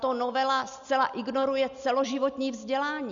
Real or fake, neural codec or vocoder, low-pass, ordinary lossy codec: real; none; 7.2 kHz; Opus, 64 kbps